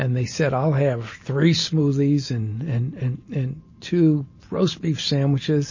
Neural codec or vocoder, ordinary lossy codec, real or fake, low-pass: none; MP3, 32 kbps; real; 7.2 kHz